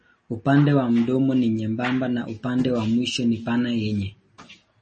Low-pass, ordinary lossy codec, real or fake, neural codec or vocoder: 10.8 kHz; MP3, 32 kbps; real; none